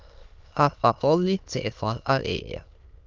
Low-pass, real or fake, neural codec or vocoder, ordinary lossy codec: 7.2 kHz; fake; autoencoder, 22.05 kHz, a latent of 192 numbers a frame, VITS, trained on many speakers; Opus, 24 kbps